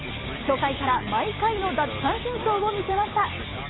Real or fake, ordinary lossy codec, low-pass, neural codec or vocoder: real; AAC, 16 kbps; 7.2 kHz; none